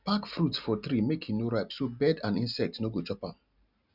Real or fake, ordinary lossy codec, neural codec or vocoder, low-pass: real; none; none; 5.4 kHz